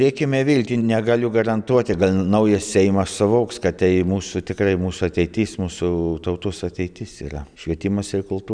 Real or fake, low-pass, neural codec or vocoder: real; 9.9 kHz; none